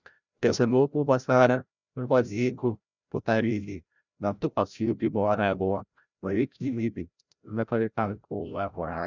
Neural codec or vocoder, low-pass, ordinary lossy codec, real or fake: codec, 16 kHz, 0.5 kbps, FreqCodec, larger model; 7.2 kHz; none; fake